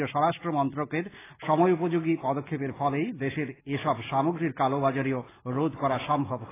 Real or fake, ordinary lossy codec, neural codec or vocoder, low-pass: real; AAC, 16 kbps; none; 3.6 kHz